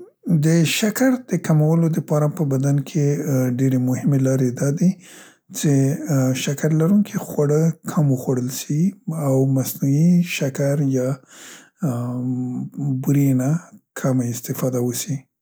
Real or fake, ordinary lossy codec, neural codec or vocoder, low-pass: real; none; none; none